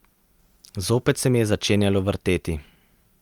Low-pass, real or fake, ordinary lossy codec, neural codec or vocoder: 19.8 kHz; real; Opus, 32 kbps; none